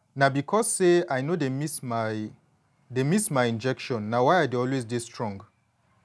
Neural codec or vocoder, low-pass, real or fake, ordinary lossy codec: none; none; real; none